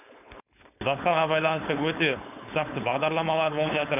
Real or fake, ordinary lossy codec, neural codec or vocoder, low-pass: fake; none; codec, 16 kHz, 4.8 kbps, FACodec; 3.6 kHz